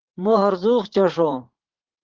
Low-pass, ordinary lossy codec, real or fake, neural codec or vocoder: 7.2 kHz; Opus, 16 kbps; fake; vocoder, 44.1 kHz, 80 mel bands, Vocos